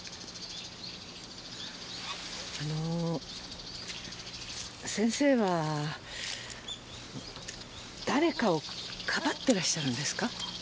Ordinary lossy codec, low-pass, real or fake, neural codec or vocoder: none; none; real; none